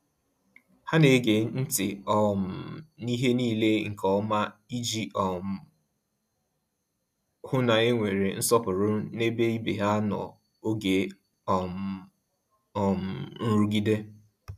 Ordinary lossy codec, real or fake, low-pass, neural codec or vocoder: none; real; 14.4 kHz; none